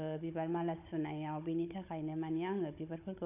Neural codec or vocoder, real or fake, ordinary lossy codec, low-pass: codec, 16 kHz, 16 kbps, FunCodec, trained on Chinese and English, 50 frames a second; fake; AAC, 32 kbps; 3.6 kHz